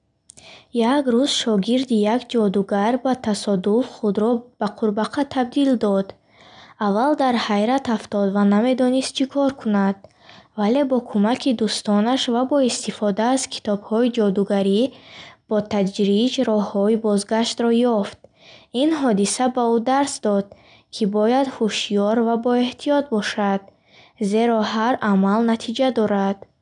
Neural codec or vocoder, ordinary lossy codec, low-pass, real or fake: none; none; 9.9 kHz; real